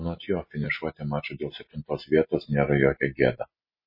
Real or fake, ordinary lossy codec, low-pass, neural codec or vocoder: real; MP3, 24 kbps; 5.4 kHz; none